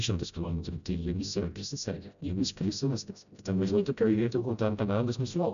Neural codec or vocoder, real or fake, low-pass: codec, 16 kHz, 0.5 kbps, FreqCodec, smaller model; fake; 7.2 kHz